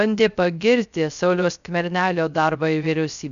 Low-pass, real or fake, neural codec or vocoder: 7.2 kHz; fake; codec, 16 kHz, 0.3 kbps, FocalCodec